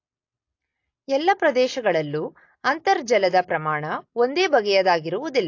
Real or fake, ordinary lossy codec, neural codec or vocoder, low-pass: real; AAC, 48 kbps; none; 7.2 kHz